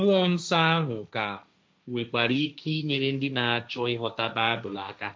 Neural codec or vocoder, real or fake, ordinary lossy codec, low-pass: codec, 16 kHz, 1.1 kbps, Voila-Tokenizer; fake; none; none